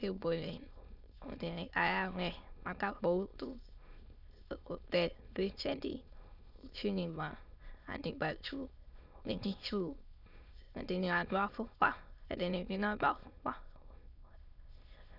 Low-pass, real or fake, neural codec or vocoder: 5.4 kHz; fake; autoencoder, 22.05 kHz, a latent of 192 numbers a frame, VITS, trained on many speakers